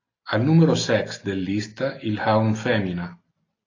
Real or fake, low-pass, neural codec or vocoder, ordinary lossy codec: real; 7.2 kHz; none; AAC, 32 kbps